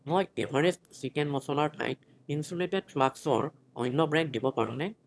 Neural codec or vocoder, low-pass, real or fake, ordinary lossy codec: autoencoder, 22.05 kHz, a latent of 192 numbers a frame, VITS, trained on one speaker; none; fake; none